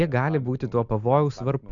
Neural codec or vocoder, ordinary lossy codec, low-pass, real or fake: none; AAC, 64 kbps; 7.2 kHz; real